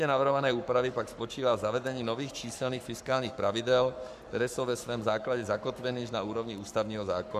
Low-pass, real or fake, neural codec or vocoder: 14.4 kHz; fake; codec, 44.1 kHz, 7.8 kbps, Pupu-Codec